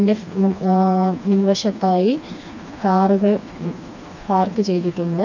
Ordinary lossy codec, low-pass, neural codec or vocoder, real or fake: none; 7.2 kHz; codec, 16 kHz, 2 kbps, FreqCodec, smaller model; fake